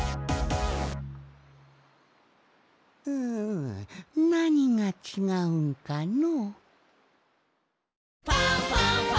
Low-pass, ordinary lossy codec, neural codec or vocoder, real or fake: none; none; none; real